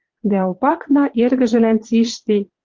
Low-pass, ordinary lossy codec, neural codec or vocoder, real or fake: 7.2 kHz; Opus, 16 kbps; vocoder, 22.05 kHz, 80 mel bands, Vocos; fake